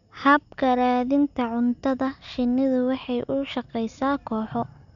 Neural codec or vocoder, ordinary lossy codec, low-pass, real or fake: none; none; 7.2 kHz; real